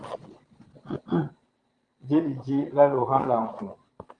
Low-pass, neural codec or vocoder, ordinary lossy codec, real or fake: 9.9 kHz; vocoder, 22.05 kHz, 80 mel bands, Vocos; Opus, 24 kbps; fake